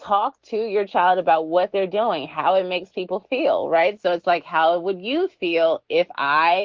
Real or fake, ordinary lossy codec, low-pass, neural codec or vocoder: fake; Opus, 16 kbps; 7.2 kHz; autoencoder, 48 kHz, 128 numbers a frame, DAC-VAE, trained on Japanese speech